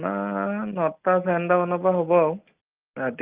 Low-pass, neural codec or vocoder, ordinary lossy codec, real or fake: 3.6 kHz; none; Opus, 32 kbps; real